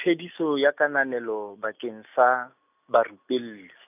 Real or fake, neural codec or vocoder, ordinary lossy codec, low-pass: real; none; none; 3.6 kHz